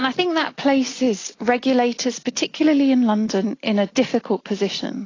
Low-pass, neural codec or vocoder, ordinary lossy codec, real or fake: 7.2 kHz; none; AAC, 32 kbps; real